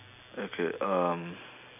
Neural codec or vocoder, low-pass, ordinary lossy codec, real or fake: none; 3.6 kHz; none; real